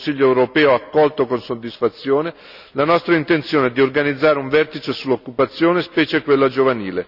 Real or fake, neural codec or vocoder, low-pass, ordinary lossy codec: real; none; 5.4 kHz; none